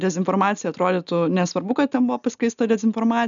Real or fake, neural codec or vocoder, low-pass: real; none; 7.2 kHz